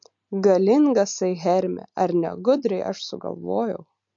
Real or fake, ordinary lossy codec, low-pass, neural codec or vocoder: real; MP3, 48 kbps; 7.2 kHz; none